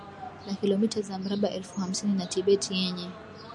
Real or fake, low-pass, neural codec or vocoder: real; 10.8 kHz; none